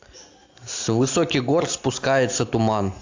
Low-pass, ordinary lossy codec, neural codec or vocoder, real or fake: 7.2 kHz; AAC, 48 kbps; none; real